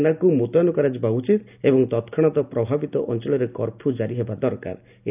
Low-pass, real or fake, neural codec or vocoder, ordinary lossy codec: 3.6 kHz; real; none; none